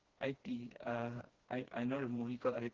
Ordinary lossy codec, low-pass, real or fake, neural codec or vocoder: Opus, 16 kbps; 7.2 kHz; fake; codec, 16 kHz, 2 kbps, FreqCodec, smaller model